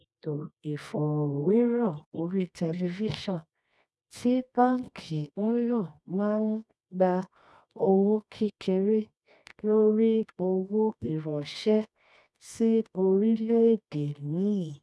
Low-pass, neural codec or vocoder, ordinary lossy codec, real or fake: none; codec, 24 kHz, 0.9 kbps, WavTokenizer, medium music audio release; none; fake